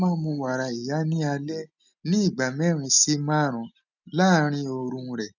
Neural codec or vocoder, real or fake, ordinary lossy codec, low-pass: none; real; none; 7.2 kHz